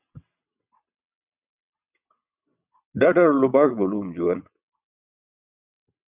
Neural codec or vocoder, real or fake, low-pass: vocoder, 22.05 kHz, 80 mel bands, WaveNeXt; fake; 3.6 kHz